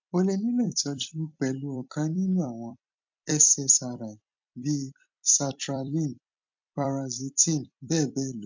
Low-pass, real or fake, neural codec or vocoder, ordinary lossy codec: 7.2 kHz; fake; vocoder, 44.1 kHz, 128 mel bands every 256 samples, BigVGAN v2; MP3, 64 kbps